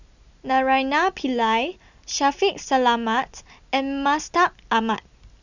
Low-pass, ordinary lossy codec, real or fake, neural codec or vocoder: 7.2 kHz; none; real; none